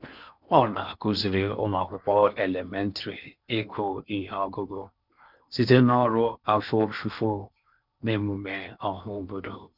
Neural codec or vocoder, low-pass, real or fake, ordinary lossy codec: codec, 16 kHz in and 24 kHz out, 0.8 kbps, FocalCodec, streaming, 65536 codes; 5.4 kHz; fake; none